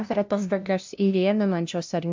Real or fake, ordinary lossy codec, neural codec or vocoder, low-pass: fake; MP3, 64 kbps; codec, 16 kHz, 0.5 kbps, FunCodec, trained on LibriTTS, 25 frames a second; 7.2 kHz